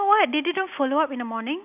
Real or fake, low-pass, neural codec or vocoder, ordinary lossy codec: real; 3.6 kHz; none; none